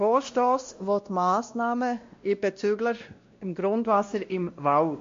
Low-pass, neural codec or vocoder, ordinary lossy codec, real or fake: 7.2 kHz; codec, 16 kHz, 1 kbps, X-Codec, WavLM features, trained on Multilingual LibriSpeech; MP3, 48 kbps; fake